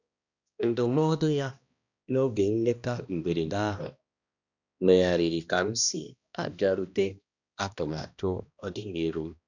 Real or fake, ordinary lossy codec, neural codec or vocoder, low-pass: fake; none; codec, 16 kHz, 1 kbps, X-Codec, HuBERT features, trained on balanced general audio; 7.2 kHz